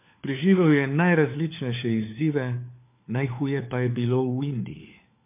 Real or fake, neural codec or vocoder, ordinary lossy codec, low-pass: fake; codec, 16 kHz, 4 kbps, FunCodec, trained on LibriTTS, 50 frames a second; MP3, 32 kbps; 3.6 kHz